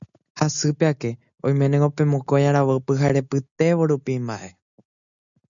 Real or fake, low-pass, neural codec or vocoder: real; 7.2 kHz; none